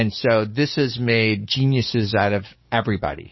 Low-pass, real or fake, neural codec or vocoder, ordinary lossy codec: 7.2 kHz; fake; codec, 44.1 kHz, 7.8 kbps, DAC; MP3, 24 kbps